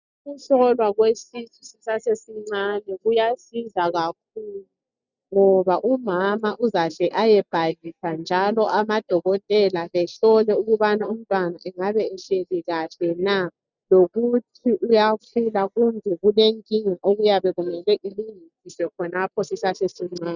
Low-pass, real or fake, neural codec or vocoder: 7.2 kHz; real; none